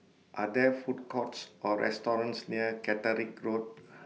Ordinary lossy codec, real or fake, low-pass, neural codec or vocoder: none; real; none; none